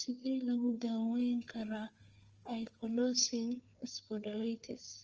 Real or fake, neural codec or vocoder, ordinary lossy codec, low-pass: fake; codec, 16 kHz, 4 kbps, FunCodec, trained on Chinese and English, 50 frames a second; Opus, 24 kbps; 7.2 kHz